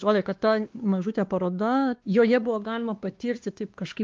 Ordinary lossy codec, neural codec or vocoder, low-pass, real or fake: Opus, 32 kbps; codec, 16 kHz, 2 kbps, X-Codec, WavLM features, trained on Multilingual LibriSpeech; 7.2 kHz; fake